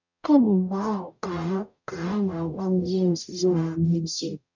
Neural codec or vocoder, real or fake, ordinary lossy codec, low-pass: codec, 44.1 kHz, 0.9 kbps, DAC; fake; none; 7.2 kHz